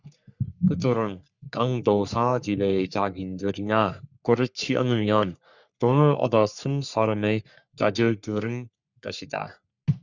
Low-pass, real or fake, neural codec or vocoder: 7.2 kHz; fake; codec, 44.1 kHz, 3.4 kbps, Pupu-Codec